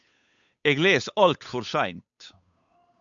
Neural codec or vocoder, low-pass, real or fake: codec, 16 kHz, 8 kbps, FunCodec, trained on Chinese and English, 25 frames a second; 7.2 kHz; fake